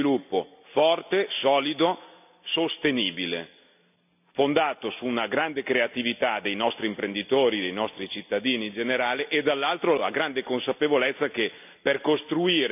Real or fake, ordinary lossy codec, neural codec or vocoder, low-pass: real; none; none; 3.6 kHz